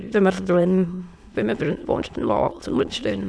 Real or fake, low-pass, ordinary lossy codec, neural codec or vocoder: fake; none; none; autoencoder, 22.05 kHz, a latent of 192 numbers a frame, VITS, trained on many speakers